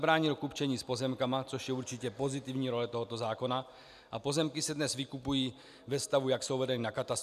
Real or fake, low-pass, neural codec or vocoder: real; 14.4 kHz; none